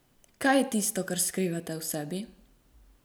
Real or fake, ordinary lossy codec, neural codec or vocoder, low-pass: real; none; none; none